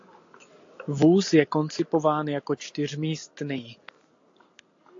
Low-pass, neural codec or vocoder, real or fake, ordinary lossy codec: 7.2 kHz; none; real; AAC, 48 kbps